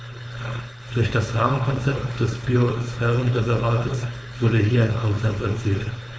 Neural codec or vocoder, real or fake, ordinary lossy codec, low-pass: codec, 16 kHz, 4.8 kbps, FACodec; fake; none; none